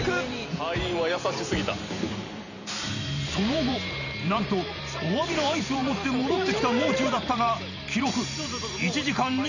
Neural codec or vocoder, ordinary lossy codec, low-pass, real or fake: vocoder, 44.1 kHz, 128 mel bands every 512 samples, BigVGAN v2; none; 7.2 kHz; fake